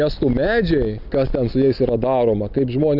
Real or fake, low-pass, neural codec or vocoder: real; 5.4 kHz; none